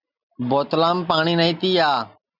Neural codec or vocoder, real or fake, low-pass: none; real; 5.4 kHz